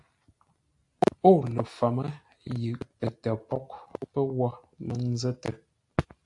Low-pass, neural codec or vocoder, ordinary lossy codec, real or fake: 10.8 kHz; none; AAC, 64 kbps; real